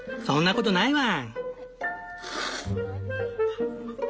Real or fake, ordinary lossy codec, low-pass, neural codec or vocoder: real; none; none; none